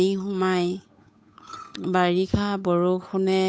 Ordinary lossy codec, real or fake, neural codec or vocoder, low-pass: none; fake; codec, 16 kHz, 8 kbps, FunCodec, trained on Chinese and English, 25 frames a second; none